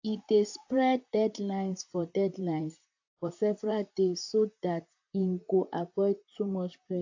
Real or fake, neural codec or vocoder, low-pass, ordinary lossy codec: fake; vocoder, 44.1 kHz, 128 mel bands, Pupu-Vocoder; 7.2 kHz; AAC, 48 kbps